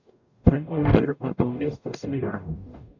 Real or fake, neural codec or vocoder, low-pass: fake; codec, 44.1 kHz, 0.9 kbps, DAC; 7.2 kHz